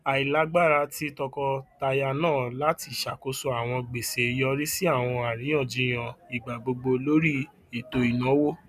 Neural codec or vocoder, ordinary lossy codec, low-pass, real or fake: none; none; 14.4 kHz; real